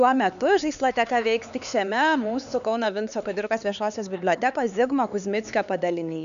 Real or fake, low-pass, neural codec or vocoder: fake; 7.2 kHz; codec, 16 kHz, 4 kbps, X-Codec, HuBERT features, trained on LibriSpeech